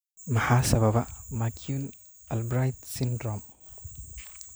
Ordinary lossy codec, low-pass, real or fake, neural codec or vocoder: none; none; real; none